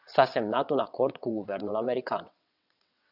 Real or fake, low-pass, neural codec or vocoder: fake; 5.4 kHz; vocoder, 22.05 kHz, 80 mel bands, WaveNeXt